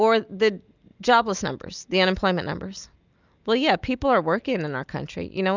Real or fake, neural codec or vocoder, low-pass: real; none; 7.2 kHz